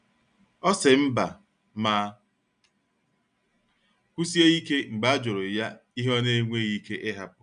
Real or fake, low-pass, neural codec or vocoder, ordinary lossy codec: real; 9.9 kHz; none; none